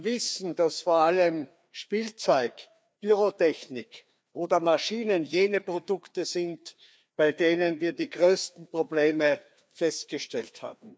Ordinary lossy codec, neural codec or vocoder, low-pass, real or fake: none; codec, 16 kHz, 2 kbps, FreqCodec, larger model; none; fake